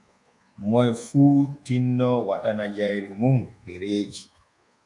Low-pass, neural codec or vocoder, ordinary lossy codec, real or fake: 10.8 kHz; codec, 24 kHz, 1.2 kbps, DualCodec; MP3, 96 kbps; fake